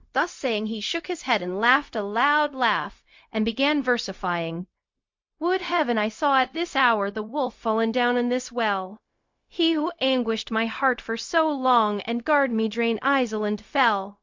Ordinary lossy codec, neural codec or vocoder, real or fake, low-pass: MP3, 48 kbps; codec, 16 kHz, 0.4 kbps, LongCat-Audio-Codec; fake; 7.2 kHz